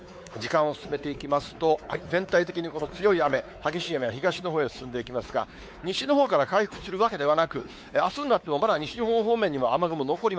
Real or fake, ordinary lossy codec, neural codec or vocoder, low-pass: fake; none; codec, 16 kHz, 4 kbps, X-Codec, WavLM features, trained on Multilingual LibriSpeech; none